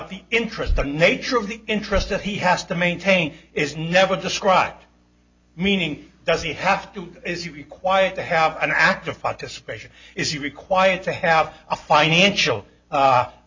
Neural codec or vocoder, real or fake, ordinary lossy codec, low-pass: none; real; MP3, 64 kbps; 7.2 kHz